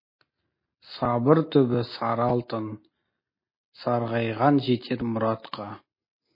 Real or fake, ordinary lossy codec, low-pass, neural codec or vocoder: real; MP3, 24 kbps; 5.4 kHz; none